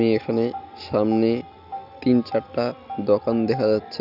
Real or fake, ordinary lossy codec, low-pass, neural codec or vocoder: real; none; 5.4 kHz; none